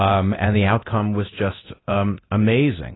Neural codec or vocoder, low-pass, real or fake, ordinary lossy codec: codec, 24 kHz, 0.9 kbps, DualCodec; 7.2 kHz; fake; AAC, 16 kbps